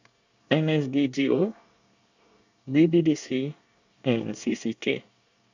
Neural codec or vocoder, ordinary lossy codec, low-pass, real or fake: codec, 24 kHz, 1 kbps, SNAC; none; 7.2 kHz; fake